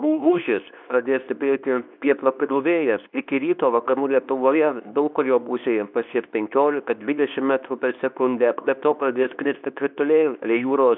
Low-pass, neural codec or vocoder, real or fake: 5.4 kHz; codec, 24 kHz, 0.9 kbps, WavTokenizer, medium speech release version 2; fake